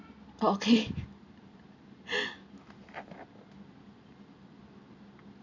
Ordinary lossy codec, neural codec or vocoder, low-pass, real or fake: MP3, 64 kbps; none; 7.2 kHz; real